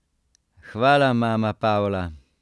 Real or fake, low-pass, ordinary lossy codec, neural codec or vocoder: real; none; none; none